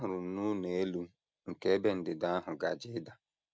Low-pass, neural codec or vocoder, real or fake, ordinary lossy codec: none; none; real; none